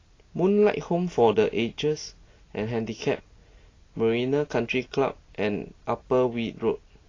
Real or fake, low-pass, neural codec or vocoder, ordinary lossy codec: real; 7.2 kHz; none; AAC, 32 kbps